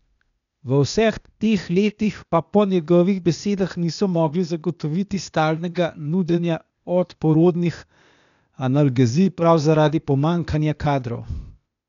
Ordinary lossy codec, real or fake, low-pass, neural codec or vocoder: none; fake; 7.2 kHz; codec, 16 kHz, 0.8 kbps, ZipCodec